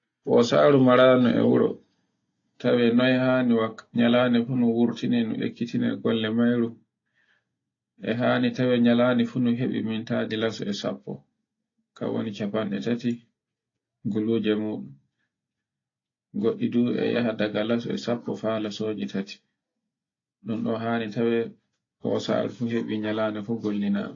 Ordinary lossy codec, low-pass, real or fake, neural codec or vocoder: AAC, 32 kbps; 7.2 kHz; real; none